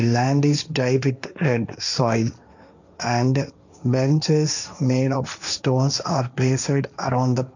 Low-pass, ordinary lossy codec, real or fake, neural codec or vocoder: 7.2 kHz; none; fake; codec, 16 kHz, 1.1 kbps, Voila-Tokenizer